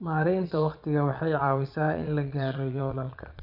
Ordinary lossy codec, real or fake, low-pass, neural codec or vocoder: none; fake; 5.4 kHz; vocoder, 22.05 kHz, 80 mel bands, Vocos